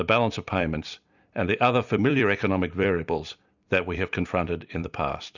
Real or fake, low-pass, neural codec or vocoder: fake; 7.2 kHz; vocoder, 44.1 kHz, 128 mel bands every 256 samples, BigVGAN v2